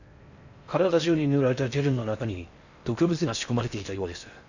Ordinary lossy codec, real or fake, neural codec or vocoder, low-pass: none; fake; codec, 16 kHz in and 24 kHz out, 0.6 kbps, FocalCodec, streaming, 4096 codes; 7.2 kHz